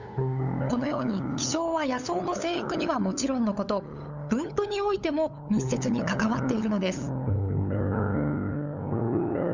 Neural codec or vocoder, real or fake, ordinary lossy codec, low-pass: codec, 16 kHz, 8 kbps, FunCodec, trained on LibriTTS, 25 frames a second; fake; none; 7.2 kHz